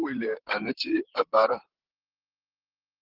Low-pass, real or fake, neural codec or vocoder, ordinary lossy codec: 5.4 kHz; fake; vocoder, 44.1 kHz, 128 mel bands, Pupu-Vocoder; Opus, 16 kbps